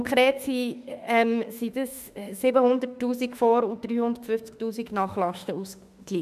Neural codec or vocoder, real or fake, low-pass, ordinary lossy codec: autoencoder, 48 kHz, 32 numbers a frame, DAC-VAE, trained on Japanese speech; fake; 14.4 kHz; none